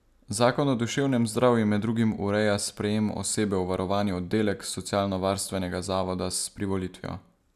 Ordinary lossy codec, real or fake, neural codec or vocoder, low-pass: none; real; none; 14.4 kHz